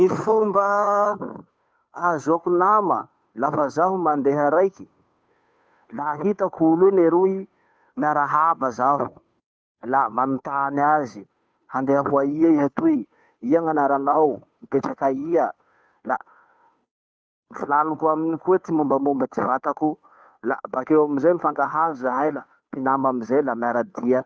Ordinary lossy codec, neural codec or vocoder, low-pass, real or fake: none; codec, 16 kHz, 2 kbps, FunCodec, trained on Chinese and English, 25 frames a second; none; fake